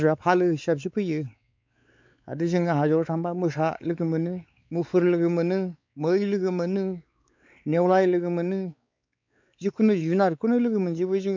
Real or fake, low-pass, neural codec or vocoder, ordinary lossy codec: fake; 7.2 kHz; codec, 16 kHz, 4 kbps, X-Codec, WavLM features, trained on Multilingual LibriSpeech; MP3, 64 kbps